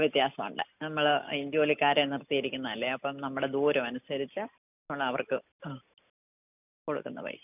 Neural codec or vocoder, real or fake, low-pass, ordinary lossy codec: none; real; 3.6 kHz; none